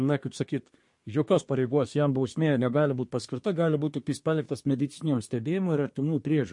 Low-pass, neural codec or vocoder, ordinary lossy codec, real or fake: 10.8 kHz; codec, 24 kHz, 1 kbps, SNAC; MP3, 48 kbps; fake